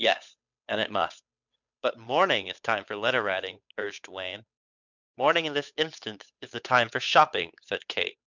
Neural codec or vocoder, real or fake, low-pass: codec, 16 kHz, 8 kbps, FunCodec, trained on Chinese and English, 25 frames a second; fake; 7.2 kHz